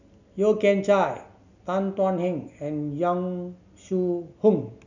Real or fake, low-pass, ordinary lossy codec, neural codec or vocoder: real; 7.2 kHz; none; none